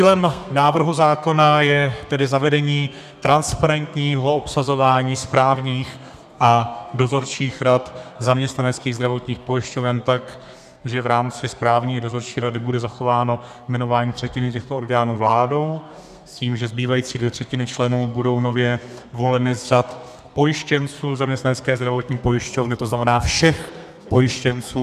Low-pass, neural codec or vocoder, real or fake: 14.4 kHz; codec, 32 kHz, 1.9 kbps, SNAC; fake